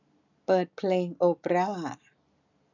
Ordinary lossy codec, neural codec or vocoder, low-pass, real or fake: none; none; 7.2 kHz; real